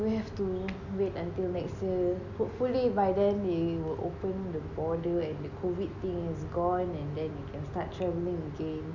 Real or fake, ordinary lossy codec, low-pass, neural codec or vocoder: real; none; 7.2 kHz; none